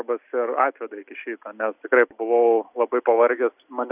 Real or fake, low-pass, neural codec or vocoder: real; 3.6 kHz; none